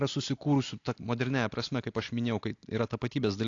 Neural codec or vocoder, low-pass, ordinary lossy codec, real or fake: none; 7.2 kHz; AAC, 48 kbps; real